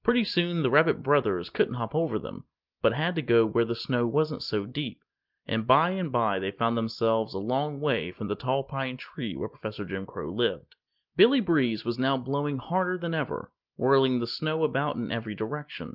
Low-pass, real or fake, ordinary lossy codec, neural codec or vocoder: 5.4 kHz; real; Opus, 24 kbps; none